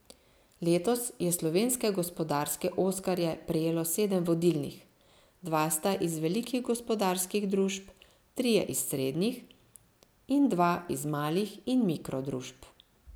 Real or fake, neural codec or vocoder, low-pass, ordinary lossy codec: real; none; none; none